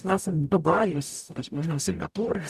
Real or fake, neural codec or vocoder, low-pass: fake; codec, 44.1 kHz, 0.9 kbps, DAC; 14.4 kHz